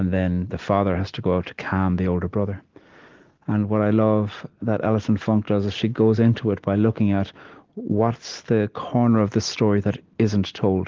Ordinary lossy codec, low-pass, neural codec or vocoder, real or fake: Opus, 16 kbps; 7.2 kHz; none; real